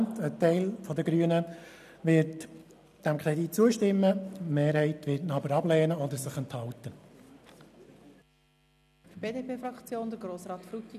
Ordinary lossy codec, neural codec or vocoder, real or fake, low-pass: none; none; real; 14.4 kHz